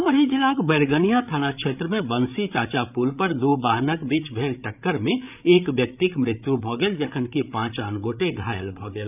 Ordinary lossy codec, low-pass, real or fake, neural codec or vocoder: none; 3.6 kHz; fake; codec, 16 kHz, 8 kbps, FreqCodec, larger model